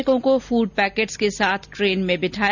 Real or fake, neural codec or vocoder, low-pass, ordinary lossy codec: real; none; 7.2 kHz; none